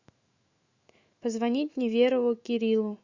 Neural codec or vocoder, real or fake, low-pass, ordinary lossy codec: autoencoder, 48 kHz, 128 numbers a frame, DAC-VAE, trained on Japanese speech; fake; 7.2 kHz; Opus, 64 kbps